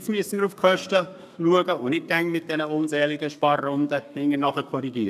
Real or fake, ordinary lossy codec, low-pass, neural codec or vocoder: fake; none; 14.4 kHz; codec, 32 kHz, 1.9 kbps, SNAC